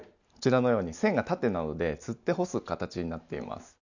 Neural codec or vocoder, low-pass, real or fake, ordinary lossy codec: none; 7.2 kHz; real; none